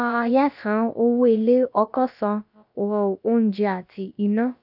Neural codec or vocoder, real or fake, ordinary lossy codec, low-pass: codec, 16 kHz, about 1 kbps, DyCAST, with the encoder's durations; fake; none; 5.4 kHz